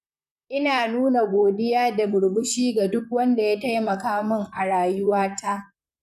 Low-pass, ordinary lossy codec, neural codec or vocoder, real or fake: 14.4 kHz; none; vocoder, 44.1 kHz, 128 mel bands, Pupu-Vocoder; fake